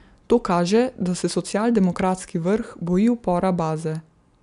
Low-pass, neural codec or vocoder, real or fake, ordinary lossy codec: 10.8 kHz; none; real; none